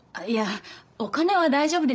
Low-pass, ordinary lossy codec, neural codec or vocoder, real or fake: none; none; codec, 16 kHz, 16 kbps, FreqCodec, larger model; fake